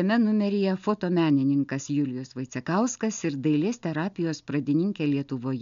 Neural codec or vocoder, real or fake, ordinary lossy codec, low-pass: none; real; MP3, 64 kbps; 7.2 kHz